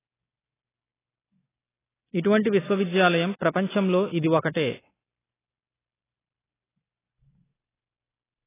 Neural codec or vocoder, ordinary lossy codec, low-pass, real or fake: none; AAC, 16 kbps; 3.6 kHz; real